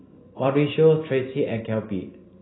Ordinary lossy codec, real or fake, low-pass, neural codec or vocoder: AAC, 16 kbps; real; 7.2 kHz; none